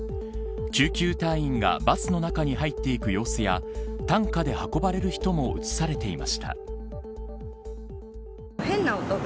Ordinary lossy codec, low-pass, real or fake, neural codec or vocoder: none; none; real; none